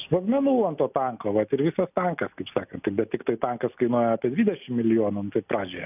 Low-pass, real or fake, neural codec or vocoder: 3.6 kHz; real; none